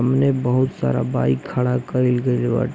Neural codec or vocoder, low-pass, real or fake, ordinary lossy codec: none; none; real; none